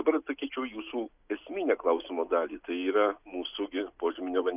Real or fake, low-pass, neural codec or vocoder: real; 3.6 kHz; none